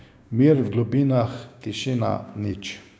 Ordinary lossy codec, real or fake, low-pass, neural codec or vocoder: none; fake; none; codec, 16 kHz, 6 kbps, DAC